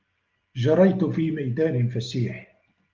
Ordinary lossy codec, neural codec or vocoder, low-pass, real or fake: Opus, 24 kbps; none; 7.2 kHz; real